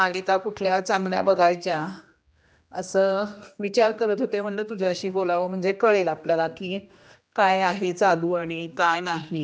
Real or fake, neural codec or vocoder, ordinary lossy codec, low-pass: fake; codec, 16 kHz, 1 kbps, X-Codec, HuBERT features, trained on general audio; none; none